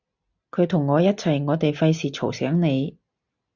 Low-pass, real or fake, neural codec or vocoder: 7.2 kHz; real; none